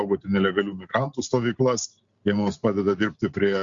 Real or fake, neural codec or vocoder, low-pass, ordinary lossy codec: real; none; 7.2 kHz; Opus, 64 kbps